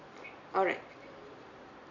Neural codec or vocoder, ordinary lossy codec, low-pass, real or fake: none; AAC, 48 kbps; 7.2 kHz; real